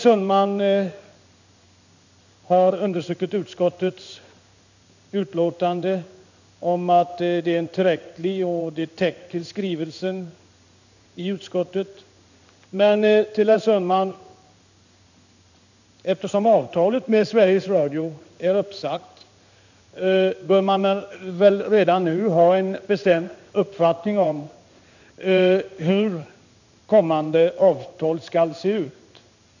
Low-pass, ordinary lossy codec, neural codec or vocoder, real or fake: 7.2 kHz; none; codec, 16 kHz in and 24 kHz out, 1 kbps, XY-Tokenizer; fake